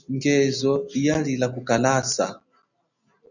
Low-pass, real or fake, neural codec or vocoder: 7.2 kHz; real; none